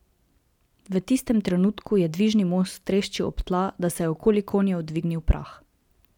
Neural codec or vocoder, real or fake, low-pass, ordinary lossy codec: none; real; 19.8 kHz; none